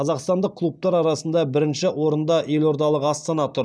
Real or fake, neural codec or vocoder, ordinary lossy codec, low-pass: real; none; none; none